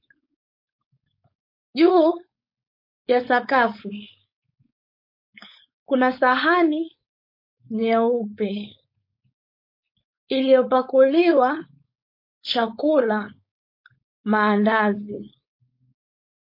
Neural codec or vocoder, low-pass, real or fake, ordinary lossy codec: codec, 16 kHz, 4.8 kbps, FACodec; 5.4 kHz; fake; MP3, 32 kbps